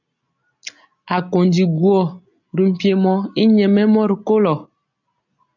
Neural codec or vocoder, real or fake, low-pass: none; real; 7.2 kHz